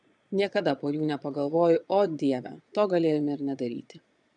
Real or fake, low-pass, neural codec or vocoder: fake; 9.9 kHz; vocoder, 22.05 kHz, 80 mel bands, Vocos